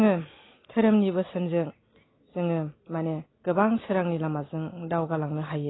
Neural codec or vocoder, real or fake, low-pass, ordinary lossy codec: none; real; 7.2 kHz; AAC, 16 kbps